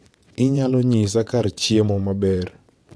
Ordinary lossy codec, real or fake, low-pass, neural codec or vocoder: none; fake; none; vocoder, 22.05 kHz, 80 mel bands, WaveNeXt